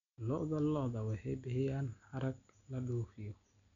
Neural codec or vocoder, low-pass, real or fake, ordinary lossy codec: none; 7.2 kHz; real; none